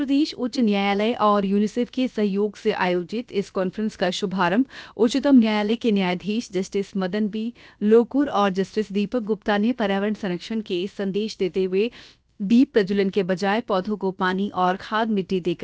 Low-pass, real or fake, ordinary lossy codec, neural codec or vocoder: none; fake; none; codec, 16 kHz, about 1 kbps, DyCAST, with the encoder's durations